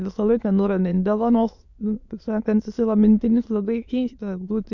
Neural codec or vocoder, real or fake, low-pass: autoencoder, 22.05 kHz, a latent of 192 numbers a frame, VITS, trained on many speakers; fake; 7.2 kHz